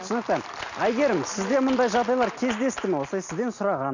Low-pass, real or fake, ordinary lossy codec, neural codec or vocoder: 7.2 kHz; real; none; none